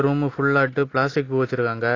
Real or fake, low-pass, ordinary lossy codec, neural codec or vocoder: real; 7.2 kHz; AAC, 32 kbps; none